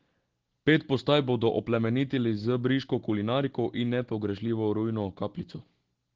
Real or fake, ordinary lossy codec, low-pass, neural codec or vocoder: real; Opus, 16 kbps; 7.2 kHz; none